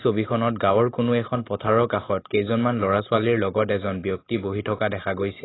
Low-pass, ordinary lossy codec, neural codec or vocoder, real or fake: 7.2 kHz; AAC, 16 kbps; none; real